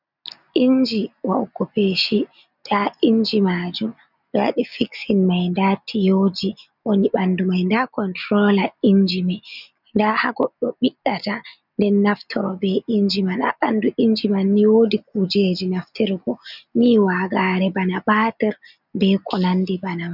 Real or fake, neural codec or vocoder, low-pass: real; none; 5.4 kHz